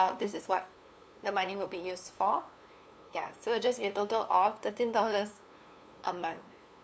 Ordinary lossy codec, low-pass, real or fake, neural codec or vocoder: none; none; fake; codec, 16 kHz, 2 kbps, FunCodec, trained on LibriTTS, 25 frames a second